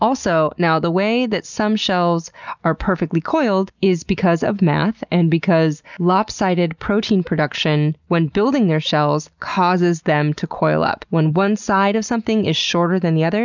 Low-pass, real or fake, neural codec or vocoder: 7.2 kHz; real; none